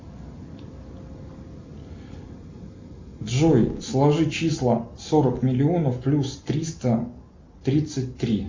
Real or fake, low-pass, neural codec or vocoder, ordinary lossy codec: real; 7.2 kHz; none; MP3, 64 kbps